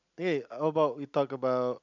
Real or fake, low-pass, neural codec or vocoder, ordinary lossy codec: real; 7.2 kHz; none; none